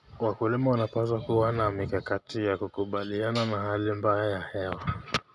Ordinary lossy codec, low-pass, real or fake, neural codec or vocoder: none; none; real; none